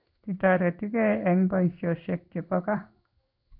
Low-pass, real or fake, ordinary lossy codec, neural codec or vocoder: 5.4 kHz; fake; none; codec, 16 kHz in and 24 kHz out, 1 kbps, XY-Tokenizer